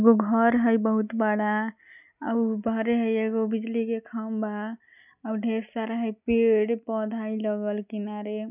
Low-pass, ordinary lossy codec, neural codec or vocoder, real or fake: 3.6 kHz; none; none; real